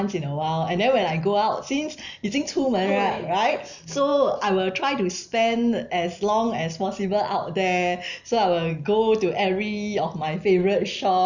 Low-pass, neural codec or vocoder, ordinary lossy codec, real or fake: 7.2 kHz; none; none; real